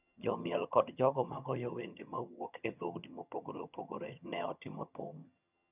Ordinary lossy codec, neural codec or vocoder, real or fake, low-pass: none; vocoder, 22.05 kHz, 80 mel bands, HiFi-GAN; fake; 3.6 kHz